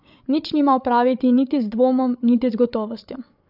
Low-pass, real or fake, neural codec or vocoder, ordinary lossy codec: 5.4 kHz; fake; codec, 16 kHz, 8 kbps, FreqCodec, larger model; none